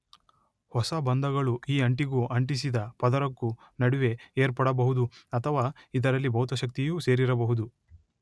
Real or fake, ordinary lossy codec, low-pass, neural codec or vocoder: real; none; none; none